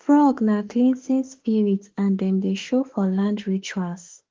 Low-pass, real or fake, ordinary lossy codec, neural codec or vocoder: 7.2 kHz; fake; Opus, 16 kbps; autoencoder, 48 kHz, 32 numbers a frame, DAC-VAE, trained on Japanese speech